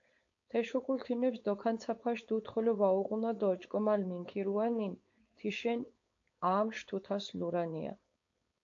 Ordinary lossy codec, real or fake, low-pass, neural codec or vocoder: AAC, 48 kbps; fake; 7.2 kHz; codec, 16 kHz, 4.8 kbps, FACodec